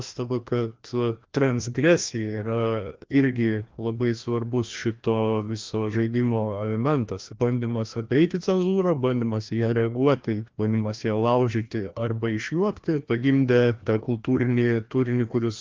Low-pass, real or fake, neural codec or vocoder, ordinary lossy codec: 7.2 kHz; fake; codec, 16 kHz, 1 kbps, FreqCodec, larger model; Opus, 32 kbps